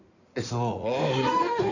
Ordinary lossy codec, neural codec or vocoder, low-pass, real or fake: none; codec, 16 kHz in and 24 kHz out, 2.2 kbps, FireRedTTS-2 codec; 7.2 kHz; fake